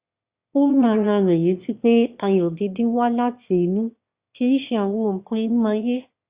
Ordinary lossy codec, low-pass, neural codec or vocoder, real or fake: Opus, 64 kbps; 3.6 kHz; autoencoder, 22.05 kHz, a latent of 192 numbers a frame, VITS, trained on one speaker; fake